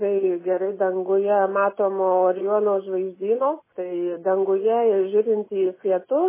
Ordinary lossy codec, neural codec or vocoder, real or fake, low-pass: MP3, 16 kbps; none; real; 3.6 kHz